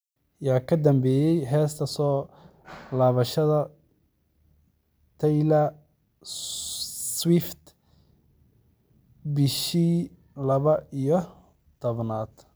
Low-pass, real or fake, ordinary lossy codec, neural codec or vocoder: none; real; none; none